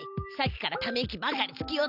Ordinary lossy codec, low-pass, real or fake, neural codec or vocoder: none; 5.4 kHz; real; none